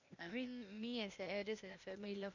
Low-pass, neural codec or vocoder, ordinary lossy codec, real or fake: 7.2 kHz; codec, 16 kHz, 0.8 kbps, ZipCodec; none; fake